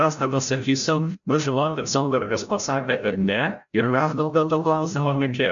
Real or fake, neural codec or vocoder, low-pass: fake; codec, 16 kHz, 0.5 kbps, FreqCodec, larger model; 7.2 kHz